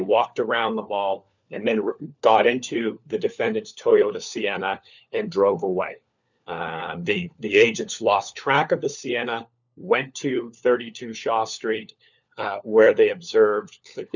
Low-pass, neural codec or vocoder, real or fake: 7.2 kHz; codec, 16 kHz, 4 kbps, FunCodec, trained on LibriTTS, 50 frames a second; fake